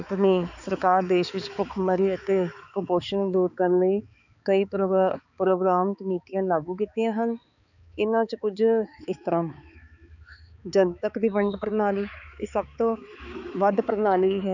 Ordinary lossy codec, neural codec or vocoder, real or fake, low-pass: none; codec, 16 kHz, 4 kbps, X-Codec, HuBERT features, trained on balanced general audio; fake; 7.2 kHz